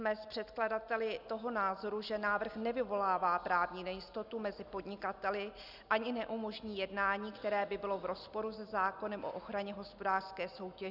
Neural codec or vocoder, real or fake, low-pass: none; real; 5.4 kHz